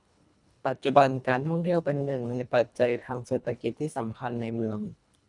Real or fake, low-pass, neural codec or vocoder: fake; 10.8 kHz; codec, 24 kHz, 1.5 kbps, HILCodec